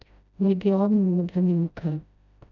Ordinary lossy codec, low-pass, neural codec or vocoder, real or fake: none; 7.2 kHz; codec, 16 kHz, 0.5 kbps, FreqCodec, smaller model; fake